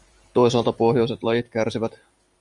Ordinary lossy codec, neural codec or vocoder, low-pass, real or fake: Opus, 64 kbps; vocoder, 44.1 kHz, 128 mel bands every 512 samples, BigVGAN v2; 10.8 kHz; fake